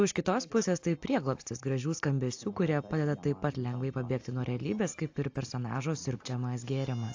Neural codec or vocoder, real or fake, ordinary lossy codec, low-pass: none; real; AAC, 48 kbps; 7.2 kHz